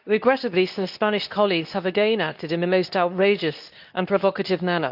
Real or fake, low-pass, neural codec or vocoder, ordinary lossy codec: fake; 5.4 kHz; codec, 24 kHz, 0.9 kbps, WavTokenizer, medium speech release version 2; none